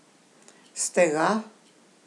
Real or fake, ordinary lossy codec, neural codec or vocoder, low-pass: real; none; none; none